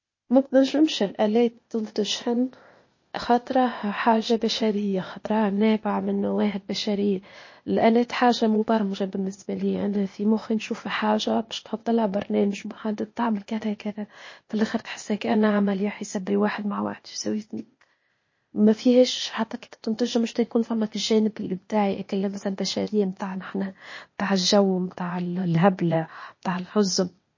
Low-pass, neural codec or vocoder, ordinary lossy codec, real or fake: 7.2 kHz; codec, 16 kHz, 0.8 kbps, ZipCodec; MP3, 32 kbps; fake